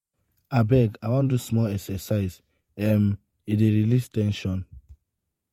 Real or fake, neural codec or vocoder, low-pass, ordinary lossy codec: fake; vocoder, 48 kHz, 128 mel bands, Vocos; 19.8 kHz; MP3, 64 kbps